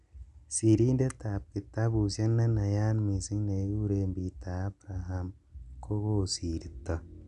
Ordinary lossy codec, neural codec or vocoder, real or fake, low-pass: none; none; real; 10.8 kHz